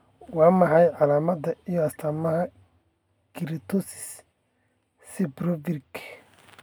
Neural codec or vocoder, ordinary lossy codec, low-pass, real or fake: none; none; none; real